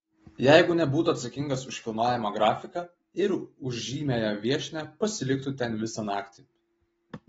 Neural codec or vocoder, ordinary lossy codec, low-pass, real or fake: none; AAC, 24 kbps; 19.8 kHz; real